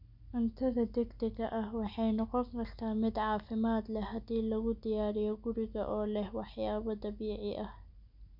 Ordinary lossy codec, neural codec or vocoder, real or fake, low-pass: none; none; real; 5.4 kHz